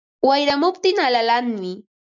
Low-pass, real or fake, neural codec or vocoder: 7.2 kHz; real; none